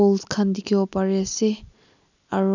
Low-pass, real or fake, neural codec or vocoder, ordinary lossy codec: 7.2 kHz; real; none; none